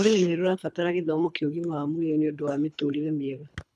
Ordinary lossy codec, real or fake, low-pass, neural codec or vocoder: none; fake; none; codec, 24 kHz, 3 kbps, HILCodec